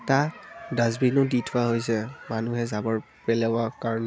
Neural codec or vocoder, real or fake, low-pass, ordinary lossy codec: none; real; none; none